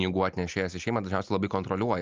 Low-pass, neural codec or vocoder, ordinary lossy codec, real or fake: 7.2 kHz; none; Opus, 24 kbps; real